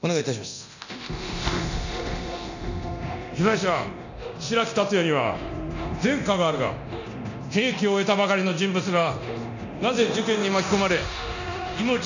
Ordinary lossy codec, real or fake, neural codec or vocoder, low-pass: none; fake; codec, 24 kHz, 0.9 kbps, DualCodec; 7.2 kHz